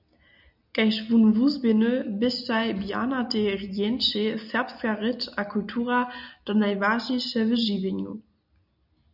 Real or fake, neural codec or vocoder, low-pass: real; none; 5.4 kHz